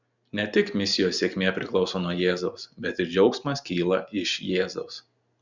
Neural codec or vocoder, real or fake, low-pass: none; real; 7.2 kHz